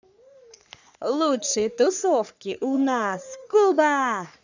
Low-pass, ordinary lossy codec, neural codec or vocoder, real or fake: 7.2 kHz; none; codec, 44.1 kHz, 3.4 kbps, Pupu-Codec; fake